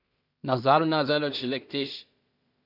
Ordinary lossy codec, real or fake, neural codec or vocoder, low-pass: Opus, 64 kbps; fake; codec, 16 kHz in and 24 kHz out, 0.4 kbps, LongCat-Audio-Codec, two codebook decoder; 5.4 kHz